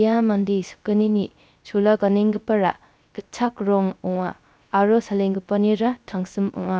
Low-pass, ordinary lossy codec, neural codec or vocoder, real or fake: none; none; codec, 16 kHz, 0.3 kbps, FocalCodec; fake